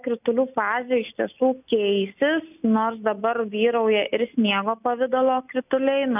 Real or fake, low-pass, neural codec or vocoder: real; 3.6 kHz; none